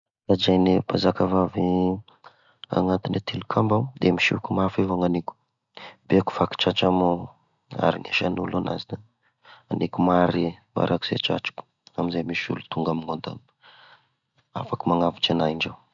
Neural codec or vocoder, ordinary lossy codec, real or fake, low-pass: none; none; real; 7.2 kHz